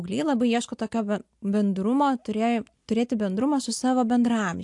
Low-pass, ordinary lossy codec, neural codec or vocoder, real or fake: 10.8 kHz; AAC, 64 kbps; none; real